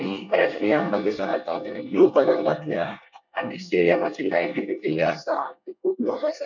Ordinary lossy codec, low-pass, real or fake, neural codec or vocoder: none; 7.2 kHz; fake; codec, 24 kHz, 1 kbps, SNAC